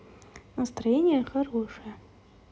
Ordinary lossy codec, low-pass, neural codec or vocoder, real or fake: none; none; none; real